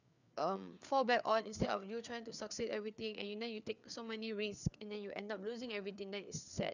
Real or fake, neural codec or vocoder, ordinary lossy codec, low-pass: fake; codec, 16 kHz, 4 kbps, FreqCodec, larger model; none; 7.2 kHz